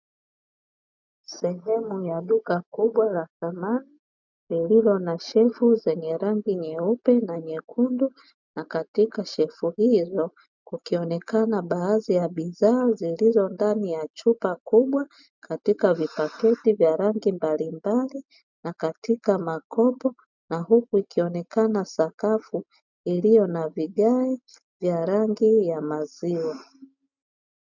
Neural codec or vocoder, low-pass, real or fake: none; 7.2 kHz; real